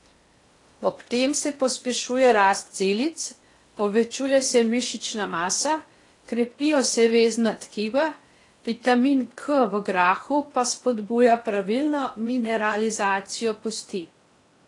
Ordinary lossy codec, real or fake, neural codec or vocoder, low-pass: AAC, 48 kbps; fake; codec, 16 kHz in and 24 kHz out, 0.8 kbps, FocalCodec, streaming, 65536 codes; 10.8 kHz